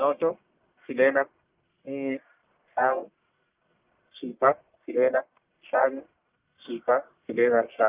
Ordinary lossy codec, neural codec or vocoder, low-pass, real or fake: Opus, 24 kbps; codec, 44.1 kHz, 1.7 kbps, Pupu-Codec; 3.6 kHz; fake